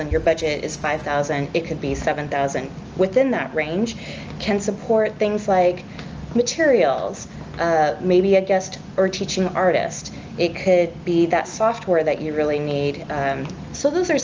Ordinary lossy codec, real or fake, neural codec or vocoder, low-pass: Opus, 24 kbps; real; none; 7.2 kHz